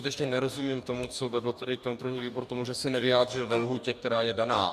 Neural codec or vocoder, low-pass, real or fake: codec, 44.1 kHz, 2.6 kbps, DAC; 14.4 kHz; fake